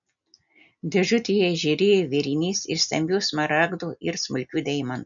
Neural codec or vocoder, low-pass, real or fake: none; 7.2 kHz; real